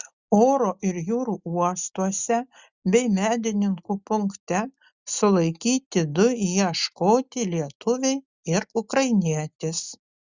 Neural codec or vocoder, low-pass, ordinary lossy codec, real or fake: none; 7.2 kHz; Opus, 64 kbps; real